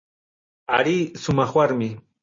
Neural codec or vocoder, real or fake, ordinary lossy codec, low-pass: none; real; MP3, 32 kbps; 7.2 kHz